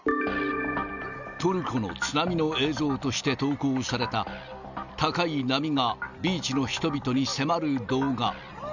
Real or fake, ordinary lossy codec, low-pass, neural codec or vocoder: real; none; 7.2 kHz; none